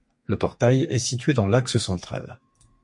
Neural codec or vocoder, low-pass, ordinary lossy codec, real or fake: codec, 32 kHz, 1.9 kbps, SNAC; 10.8 kHz; MP3, 48 kbps; fake